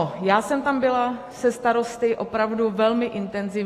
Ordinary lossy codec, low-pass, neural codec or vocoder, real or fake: AAC, 48 kbps; 14.4 kHz; none; real